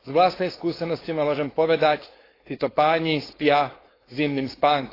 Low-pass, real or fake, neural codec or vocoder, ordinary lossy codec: 5.4 kHz; fake; codec, 16 kHz, 4.8 kbps, FACodec; AAC, 24 kbps